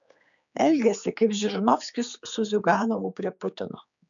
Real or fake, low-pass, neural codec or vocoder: fake; 7.2 kHz; codec, 16 kHz, 4 kbps, X-Codec, HuBERT features, trained on general audio